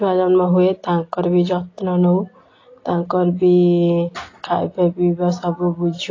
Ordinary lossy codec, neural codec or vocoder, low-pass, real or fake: AAC, 32 kbps; none; 7.2 kHz; real